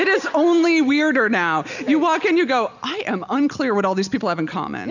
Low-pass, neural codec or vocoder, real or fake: 7.2 kHz; none; real